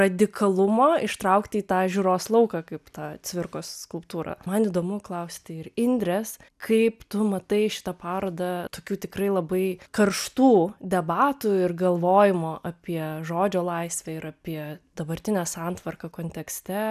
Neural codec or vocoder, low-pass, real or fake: none; 14.4 kHz; real